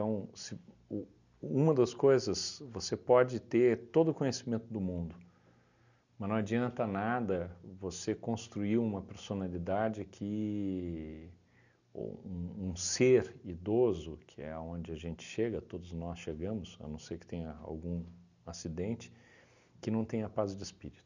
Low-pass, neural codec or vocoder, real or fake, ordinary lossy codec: 7.2 kHz; none; real; none